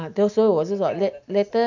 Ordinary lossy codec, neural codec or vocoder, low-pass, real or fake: none; none; 7.2 kHz; real